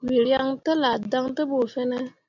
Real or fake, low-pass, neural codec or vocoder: real; 7.2 kHz; none